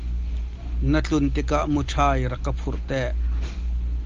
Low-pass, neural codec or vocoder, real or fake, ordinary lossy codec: 7.2 kHz; none; real; Opus, 32 kbps